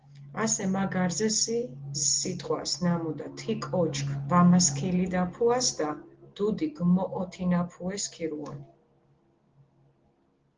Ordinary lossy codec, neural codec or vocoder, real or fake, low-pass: Opus, 16 kbps; none; real; 7.2 kHz